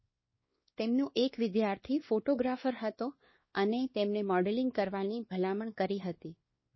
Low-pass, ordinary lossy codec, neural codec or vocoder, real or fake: 7.2 kHz; MP3, 24 kbps; codec, 16 kHz, 2 kbps, X-Codec, WavLM features, trained on Multilingual LibriSpeech; fake